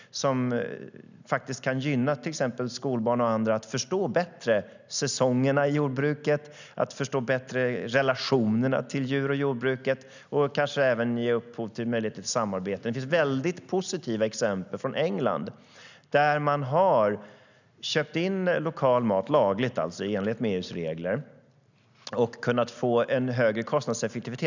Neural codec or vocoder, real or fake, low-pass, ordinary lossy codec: none; real; 7.2 kHz; none